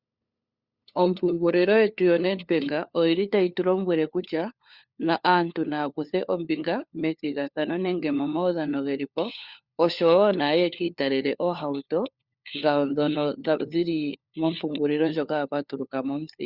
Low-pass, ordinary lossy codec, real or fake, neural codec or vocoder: 5.4 kHz; Opus, 64 kbps; fake; codec, 16 kHz, 4 kbps, FunCodec, trained on LibriTTS, 50 frames a second